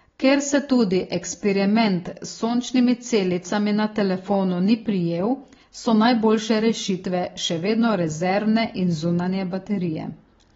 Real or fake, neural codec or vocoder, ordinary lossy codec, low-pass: real; none; AAC, 24 kbps; 7.2 kHz